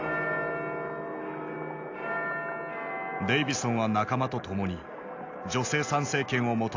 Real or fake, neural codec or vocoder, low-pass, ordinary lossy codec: real; none; 7.2 kHz; AAC, 48 kbps